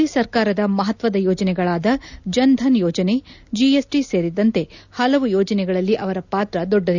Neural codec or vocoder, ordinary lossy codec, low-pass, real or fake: none; none; 7.2 kHz; real